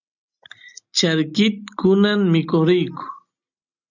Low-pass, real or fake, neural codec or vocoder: 7.2 kHz; real; none